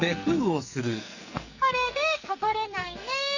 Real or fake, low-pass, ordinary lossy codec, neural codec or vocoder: fake; 7.2 kHz; none; codec, 44.1 kHz, 2.6 kbps, SNAC